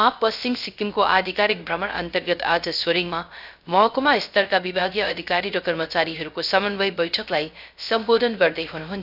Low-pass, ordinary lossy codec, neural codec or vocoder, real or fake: 5.4 kHz; none; codec, 16 kHz, 0.3 kbps, FocalCodec; fake